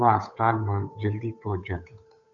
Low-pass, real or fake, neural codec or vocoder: 7.2 kHz; fake; codec, 16 kHz, 8 kbps, FunCodec, trained on Chinese and English, 25 frames a second